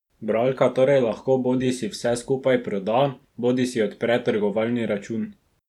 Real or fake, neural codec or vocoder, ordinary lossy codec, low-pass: fake; vocoder, 44.1 kHz, 128 mel bands every 512 samples, BigVGAN v2; none; 19.8 kHz